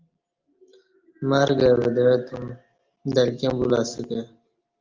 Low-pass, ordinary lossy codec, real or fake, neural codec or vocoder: 7.2 kHz; Opus, 32 kbps; real; none